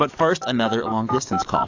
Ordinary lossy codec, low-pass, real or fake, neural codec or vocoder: AAC, 48 kbps; 7.2 kHz; fake; codec, 44.1 kHz, 7.8 kbps, DAC